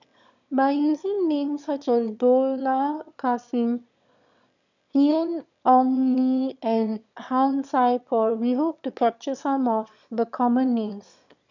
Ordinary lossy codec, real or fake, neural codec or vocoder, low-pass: none; fake; autoencoder, 22.05 kHz, a latent of 192 numbers a frame, VITS, trained on one speaker; 7.2 kHz